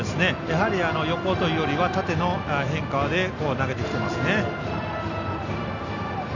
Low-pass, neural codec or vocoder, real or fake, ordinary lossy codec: 7.2 kHz; none; real; none